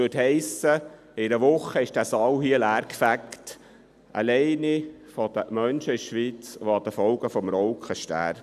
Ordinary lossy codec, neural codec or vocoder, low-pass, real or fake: none; none; 14.4 kHz; real